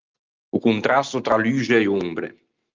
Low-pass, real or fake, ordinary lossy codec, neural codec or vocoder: 7.2 kHz; real; Opus, 24 kbps; none